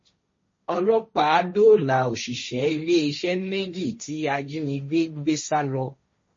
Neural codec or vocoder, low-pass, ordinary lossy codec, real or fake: codec, 16 kHz, 1.1 kbps, Voila-Tokenizer; 7.2 kHz; MP3, 32 kbps; fake